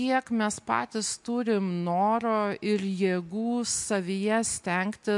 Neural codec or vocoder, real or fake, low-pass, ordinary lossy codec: none; real; 10.8 kHz; MP3, 64 kbps